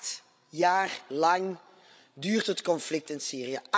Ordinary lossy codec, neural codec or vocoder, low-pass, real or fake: none; codec, 16 kHz, 16 kbps, FreqCodec, larger model; none; fake